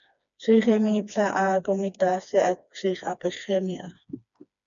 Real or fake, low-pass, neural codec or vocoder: fake; 7.2 kHz; codec, 16 kHz, 2 kbps, FreqCodec, smaller model